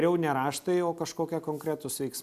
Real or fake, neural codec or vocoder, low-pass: real; none; 14.4 kHz